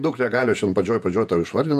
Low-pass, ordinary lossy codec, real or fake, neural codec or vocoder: 14.4 kHz; AAC, 96 kbps; fake; vocoder, 48 kHz, 128 mel bands, Vocos